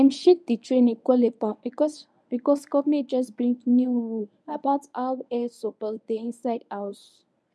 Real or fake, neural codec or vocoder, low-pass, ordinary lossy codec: fake; codec, 24 kHz, 0.9 kbps, WavTokenizer, medium speech release version 1; none; none